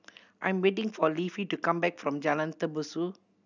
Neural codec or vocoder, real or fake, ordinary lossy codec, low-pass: none; real; none; 7.2 kHz